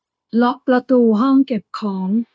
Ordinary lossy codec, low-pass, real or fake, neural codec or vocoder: none; none; fake; codec, 16 kHz, 0.9 kbps, LongCat-Audio-Codec